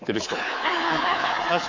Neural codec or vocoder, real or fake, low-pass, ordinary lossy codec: codec, 24 kHz, 3.1 kbps, DualCodec; fake; 7.2 kHz; AAC, 48 kbps